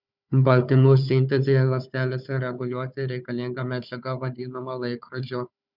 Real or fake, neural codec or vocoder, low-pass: fake; codec, 16 kHz, 4 kbps, FunCodec, trained on Chinese and English, 50 frames a second; 5.4 kHz